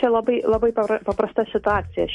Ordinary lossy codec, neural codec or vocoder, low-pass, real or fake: MP3, 48 kbps; none; 10.8 kHz; real